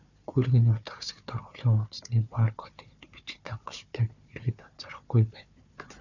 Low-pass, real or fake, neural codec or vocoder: 7.2 kHz; fake; codec, 16 kHz, 4 kbps, FunCodec, trained on Chinese and English, 50 frames a second